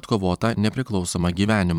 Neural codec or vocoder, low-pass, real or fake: vocoder, 44.1 kHz, 128 mel bands every 512 samples, BigVGAN v2; 19.8 kHz; fake